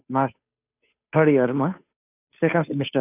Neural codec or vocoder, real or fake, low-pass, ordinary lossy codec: codec, 16 kHz, 2 kbps, FunCodec, trained on Chinese and English, 25 frames a second; fake; 3.6 kHz; none